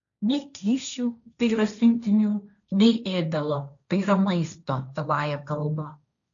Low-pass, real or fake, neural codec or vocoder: 7.2 kHz; fake; codec, 16 kHz, 1.1 kbps, Voila-Tokenizer